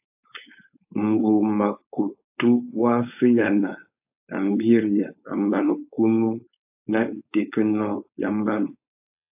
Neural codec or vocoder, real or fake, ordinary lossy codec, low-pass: codec, 16 kHz, 4.8 kbps, FACodec; fake; AAC, 32 kbps; 3.6 kHz